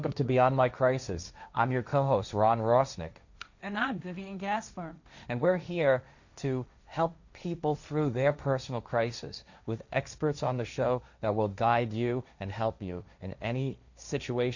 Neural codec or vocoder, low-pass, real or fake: codec, 16 kHz, 1.1 kbps, Voila-Tokenizer; 7.2 kHz; fake